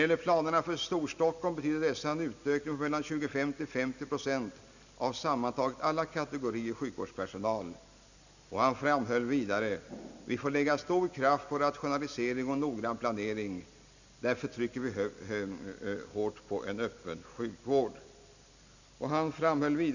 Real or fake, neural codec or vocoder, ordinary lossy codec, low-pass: real; none; none; 7.2 kHz